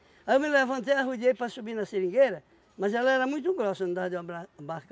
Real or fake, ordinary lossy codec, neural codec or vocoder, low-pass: real; none; none; none